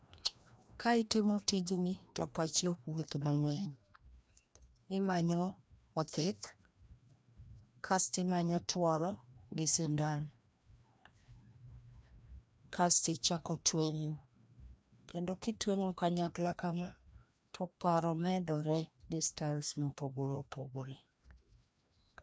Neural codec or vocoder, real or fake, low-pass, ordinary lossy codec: codec, 16 kHz, 1 kbps, FreqCodec, larger model; fake; none; none